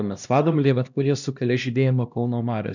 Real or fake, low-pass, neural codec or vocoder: fake; 7.2 kHz; codec, 16 kHz, 1 kbps, X-Codec, HuBERT features, trained on LibriSpeech